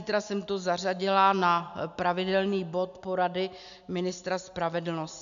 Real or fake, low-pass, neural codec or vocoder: real; 7.2 kHz; none